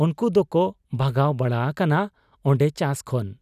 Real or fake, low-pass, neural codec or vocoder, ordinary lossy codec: real; 14.4 kHz; none; none